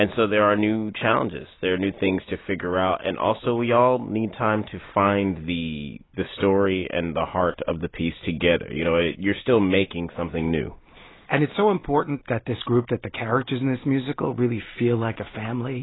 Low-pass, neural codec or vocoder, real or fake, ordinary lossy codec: 7.2 kHz; none; real; AAC, 16 kbps